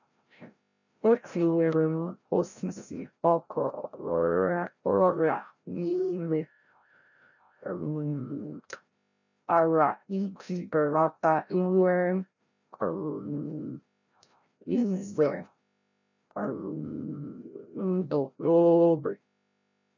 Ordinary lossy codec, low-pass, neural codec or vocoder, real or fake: none; 7.2 kHz; codec, 16 kHz, 0.5 kbps, FreqCodec, larger model; fake